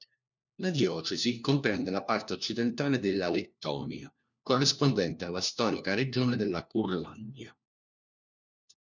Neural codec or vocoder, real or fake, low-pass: codec, 16 kHz, 1 kbps, FunCodec, trained on LibriTTS, 50 frames a second; fake; 7.2 kHz